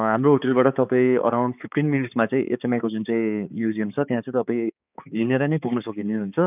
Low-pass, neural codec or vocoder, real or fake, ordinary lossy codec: 3.6 kHz; codec, 16 kHz, 4 kbps, X-Codec, HuBERT features, trained on balanced general audio; fake; none